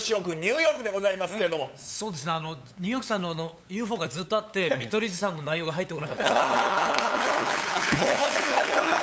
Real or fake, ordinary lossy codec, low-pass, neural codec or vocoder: fake; none; none; codec, 16 kHz, 8 kbps, FunCodec, trained on LibriTTS, 25 frames a second